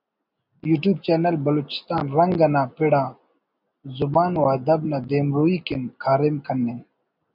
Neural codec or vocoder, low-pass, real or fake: none; 5.4 kHz; real